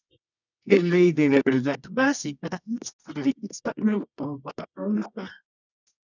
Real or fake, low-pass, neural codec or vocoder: fake; 7.2 kHz; codec, 24 kHz, 0.9 kbps, WavTokenizer, medium music audio release